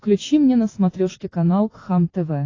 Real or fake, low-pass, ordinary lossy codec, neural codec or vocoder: real; 7.2 kHz; AAC, 32 kbps; none